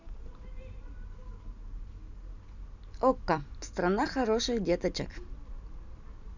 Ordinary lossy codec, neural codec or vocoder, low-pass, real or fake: none; none; 7.2 kHz; real